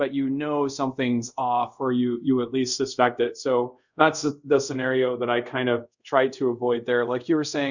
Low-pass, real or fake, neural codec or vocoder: 7.2 kHz; fake; codec, 24 kHz, 0.5 kbps, DualCodec